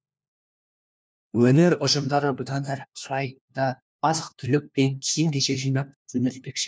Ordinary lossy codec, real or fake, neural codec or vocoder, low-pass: none; fake; codec, 16 kHz, 1 kbps, FunCodec, trained on LibriTTS, 50 frames a second; none